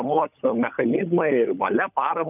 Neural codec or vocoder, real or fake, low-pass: codec, 16 kHz, 16 kbps, FunCodec, trained on LibriTTS, 50 frames a second; fake; 3.6 kHz